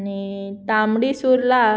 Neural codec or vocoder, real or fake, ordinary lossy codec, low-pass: none; real; none; none